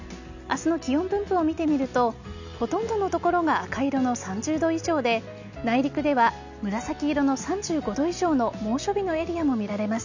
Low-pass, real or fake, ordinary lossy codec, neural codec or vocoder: 7.2 kHz; real; none; none